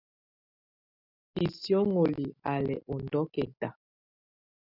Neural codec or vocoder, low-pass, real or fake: none; 5.4 kHz; real